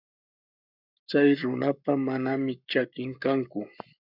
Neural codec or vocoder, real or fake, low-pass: codec, 44.1 kHz, 7.8 kbps, Pupu-Codec; fake; 5.4 kHz